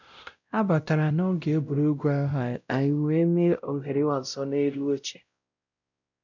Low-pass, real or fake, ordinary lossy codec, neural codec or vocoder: 7.2 kHz; fake; none; codec, 16 kHz, 0.5 kbps, X-Codec, WavLM features, trained on Multilingual LibriSpeech